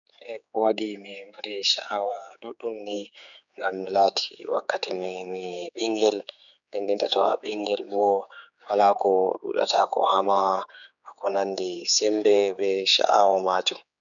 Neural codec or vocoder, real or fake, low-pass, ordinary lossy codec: codec, 16 kHz, 4 kbps, X-Codec, HuBERT features, trained on general audio; fake; 7.2 kHz; none